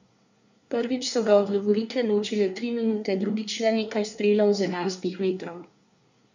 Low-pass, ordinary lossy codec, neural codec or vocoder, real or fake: 7.2 kHz; none; codec, 24 kHz, 1 kbps, SNAC; fake